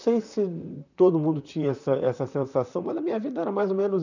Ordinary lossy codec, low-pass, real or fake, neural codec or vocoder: none; 7.2 kHz; fake; vocoder, 44.1 kHz, 128 mel bands, Pupu-Vocoder